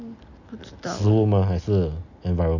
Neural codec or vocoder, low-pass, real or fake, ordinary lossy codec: none; 7.2 kHz; real; none